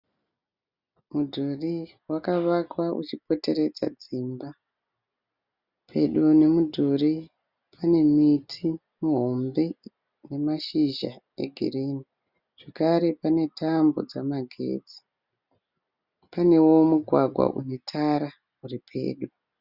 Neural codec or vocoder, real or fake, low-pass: none; real; 5.4 kHz